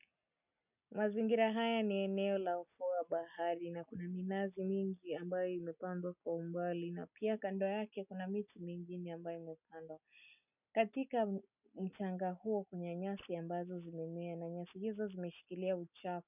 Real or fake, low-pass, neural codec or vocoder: real; 3.6 kHz; none